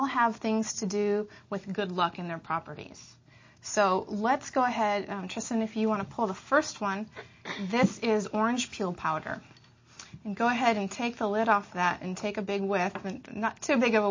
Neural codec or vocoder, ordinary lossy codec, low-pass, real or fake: none; MP3, 32 kbps; 7.2 kHz; real